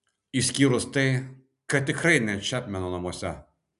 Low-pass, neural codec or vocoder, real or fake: 10.8 kHz; none; real